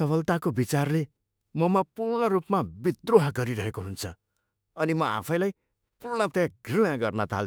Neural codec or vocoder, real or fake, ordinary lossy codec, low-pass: autoencoder, 48 kHz, 32 numbers a frame, DAC-VAE, trained on Japanese speech; fake; none; none